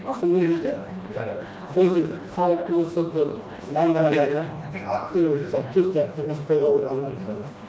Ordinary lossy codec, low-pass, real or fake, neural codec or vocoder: none; none; fake; codec, 16 kHz, 1 kbps, FreqCodec, smaller model